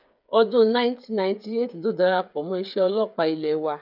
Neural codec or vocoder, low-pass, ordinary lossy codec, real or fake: codec, 16 kHz, 8 kbps, FreqCodec, smaller model; 5.4 kHz; none; fake